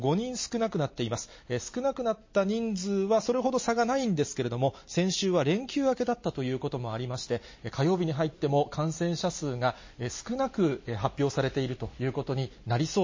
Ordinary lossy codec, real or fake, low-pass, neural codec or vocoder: MP3, 32 kbps; real; 7.2 kHz; none